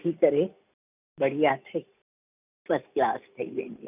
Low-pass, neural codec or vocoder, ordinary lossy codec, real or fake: 3.6 kHz; none; none; real